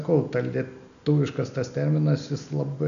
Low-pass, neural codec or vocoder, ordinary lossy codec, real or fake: 7.2 kHz; none; MP3, 96 kbps; real